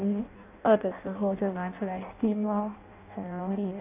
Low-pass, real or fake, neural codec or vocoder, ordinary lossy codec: 3.6 kHz; fake; codec, 16 kHz in and 24 kHz out, 0.6 kbps, FireRedTTS-2 codec; none